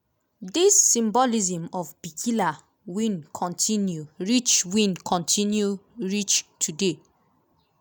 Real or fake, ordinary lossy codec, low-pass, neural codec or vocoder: real; none; none; none